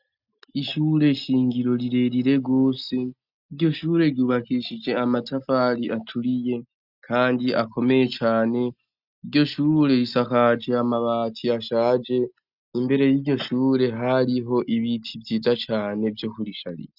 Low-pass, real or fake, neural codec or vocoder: 5.4 kHz; real; none